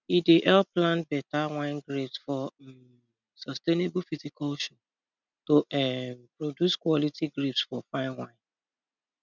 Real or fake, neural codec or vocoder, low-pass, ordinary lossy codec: real; none; 7.2 kHz; none